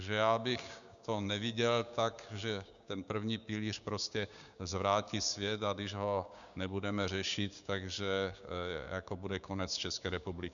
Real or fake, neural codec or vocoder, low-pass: fake; codec, 16 kHz, 6 kbps, DAC; 7.2 kHz